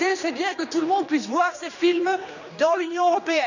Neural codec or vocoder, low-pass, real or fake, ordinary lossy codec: codec, 16 kHz, 2 kbps, X-Codec, HuBERT features, trained on general audio; 7.2 kHz; fake; none